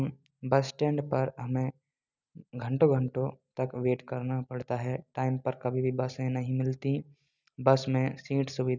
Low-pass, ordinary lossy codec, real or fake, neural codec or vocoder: 7.2 kHz; none; real; none